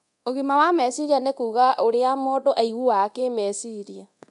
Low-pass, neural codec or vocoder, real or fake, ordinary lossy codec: 10.8 kHz; codec, 24 kHz, 0.9 kbps, DualCodec; fake; none